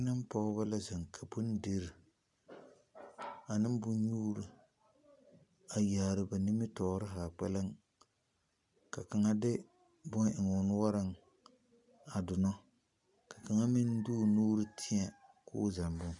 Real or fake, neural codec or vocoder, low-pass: real; none; 10.8 kHz